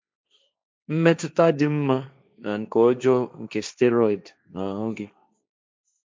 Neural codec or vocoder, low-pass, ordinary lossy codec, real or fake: codec, 16 kHz, 1.1 kbps, Voila-Tokenizer; none; none; fake